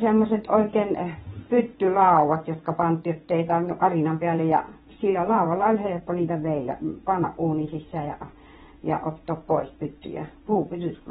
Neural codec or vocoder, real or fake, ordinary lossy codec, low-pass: vocoder, 22.05 kHz, 80 mel bands, WaveNeXt; fake; AAC, 16 kbps; 9.9 kHz